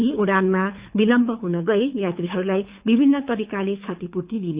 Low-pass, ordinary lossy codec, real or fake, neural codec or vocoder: 3.6 kHz; Opus, 64 kbps; fake; codec, 24 kHz, 6 kbps, HILCodec